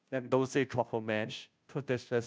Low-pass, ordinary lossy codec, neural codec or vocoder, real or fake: none; none; codec, 16 kHz, 0.5 kbps, FunCodec, trained on Chinese and English, 25 frames a second; fake